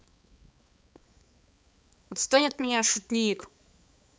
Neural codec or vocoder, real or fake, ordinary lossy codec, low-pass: codec, 16 kHz, 4 kbps, X-Codec, HuBERT features, trained on balanced general audio; fake; none; none